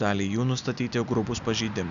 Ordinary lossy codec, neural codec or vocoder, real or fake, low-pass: MP3, 96 kbps; none; real; 7.2 kHz